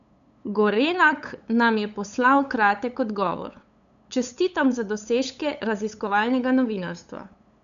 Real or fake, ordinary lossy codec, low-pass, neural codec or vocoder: fake; none; 7.2 kHz; codec, 16 kHz, 8 kbps, FunCodec, trained on LibriTTS, 25 frames a second